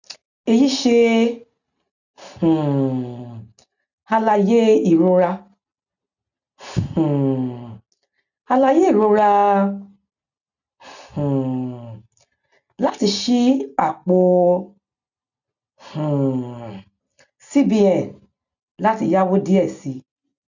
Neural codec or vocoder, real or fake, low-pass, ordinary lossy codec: none; real; 7.2 kHz; none